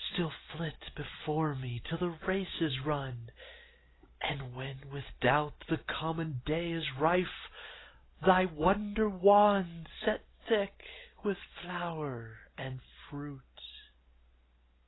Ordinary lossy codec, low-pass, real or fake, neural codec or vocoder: AAC, 16 kbps; 7.2 kHz; real; none